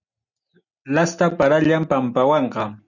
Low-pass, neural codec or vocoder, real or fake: 7.2 kHz; none; real